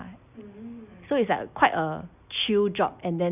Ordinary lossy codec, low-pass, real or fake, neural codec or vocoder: none; 3.6 kHz; real; none